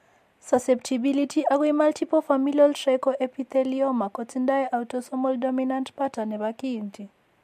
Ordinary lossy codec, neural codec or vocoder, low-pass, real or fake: MP3, 64 kbps; none; 14.4 kHz; real